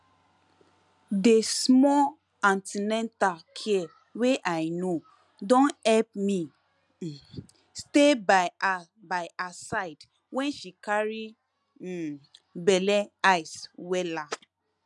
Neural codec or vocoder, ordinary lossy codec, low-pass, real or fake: none; none; none; real